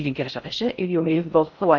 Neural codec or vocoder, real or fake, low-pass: codec, 16 kHz in and 24 kHz out, 0.6 kbps, FocalCodec, streaming, 4096 codes; fake; 7.2 kHz